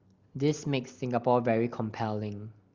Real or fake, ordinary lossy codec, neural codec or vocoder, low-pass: real; Opus, 32 kbps; none; 7.2 kHz